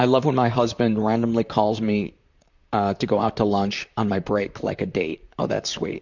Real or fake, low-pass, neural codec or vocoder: fake; 7.2 kHz; vocoder, 44.1 kHz, 128 mel bands, Pupu-Vocoder